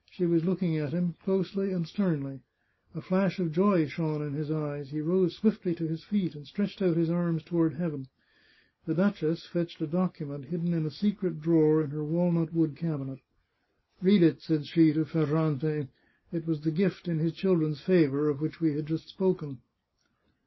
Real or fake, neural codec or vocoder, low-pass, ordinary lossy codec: real; none; 7.2 kHz; MP3, 24 kbps